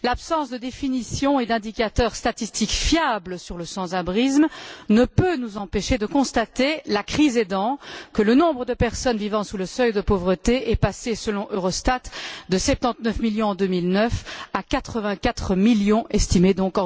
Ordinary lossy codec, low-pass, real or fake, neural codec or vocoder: none; none; real; none